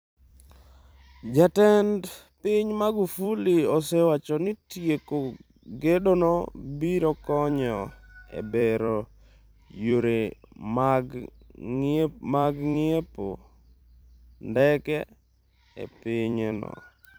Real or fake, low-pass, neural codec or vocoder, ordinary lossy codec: fake; none; vocoder, 44.1 kHz, 128 mel bands every 256 samples, BigVGAN v2; none